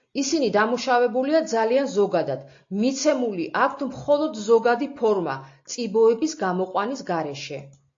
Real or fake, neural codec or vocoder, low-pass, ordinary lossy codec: real; none; 7.2 kHz; AAC, 48 kbps